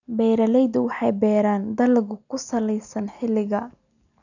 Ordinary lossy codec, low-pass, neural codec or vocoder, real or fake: none; 7.2 kHz; none; real